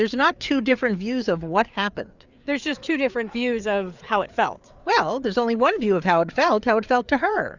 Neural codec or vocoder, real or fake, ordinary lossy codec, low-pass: codec, 16 kHz, 4 kbps, FreqCodec, larger model; fake; Opus, 64 kbps; 7.2 kHz